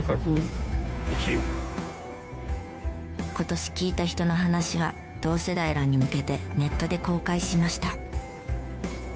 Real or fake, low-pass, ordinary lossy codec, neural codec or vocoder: fake; none; none; codec, 16 kHz, 2 kbps, FunCodec, trained on Chinese and English, 25 frames a second